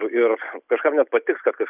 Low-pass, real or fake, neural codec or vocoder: 3.6 kHz; real; none